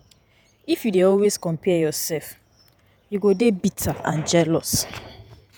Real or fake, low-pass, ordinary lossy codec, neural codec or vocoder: fake; none; none; vocoder, 48 kHz, 128 mel bands, Vocos